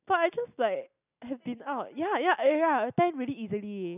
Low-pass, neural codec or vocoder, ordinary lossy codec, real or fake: 3.6 kHz; none; none; real